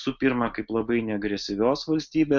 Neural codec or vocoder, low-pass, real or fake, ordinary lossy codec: none; 7.2 kHz; real; MP3, 64 kbps